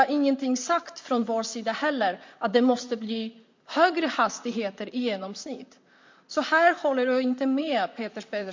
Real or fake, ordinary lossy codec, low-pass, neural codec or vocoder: fake; MP3, 48 kbps; 7.2 kHz; vocoder, 44.1 kHz, 128 mel bands, Pupu-Vocoder